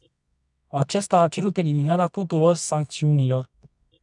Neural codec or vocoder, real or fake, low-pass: codec, 24 kHz, 0.9 kbps, WavTokenizer, medium music audio release; fake; 10.8 kHz